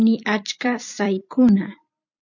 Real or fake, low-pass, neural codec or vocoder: fake; 7.2 kHz; vocoder, 44.1 kHz, 128 mel bands every 256 samples, BigVGAN v2